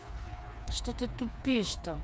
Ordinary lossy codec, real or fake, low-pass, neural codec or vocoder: none; fake; none; codec, 16 kHz, 4 kbps, FreqCodec, smaller model